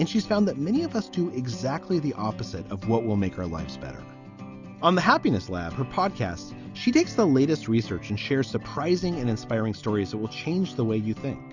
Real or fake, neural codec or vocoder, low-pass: real; none; 7.2 kHz